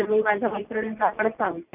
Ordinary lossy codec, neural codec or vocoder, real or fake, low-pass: none; none; real; 3.6 kHz